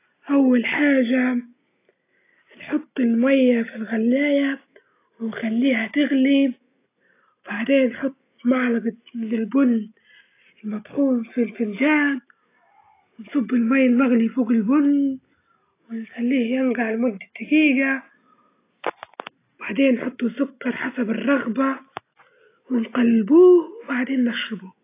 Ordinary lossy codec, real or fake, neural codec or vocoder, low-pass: AAC, 24 kbps; real; none; 3.6 kHz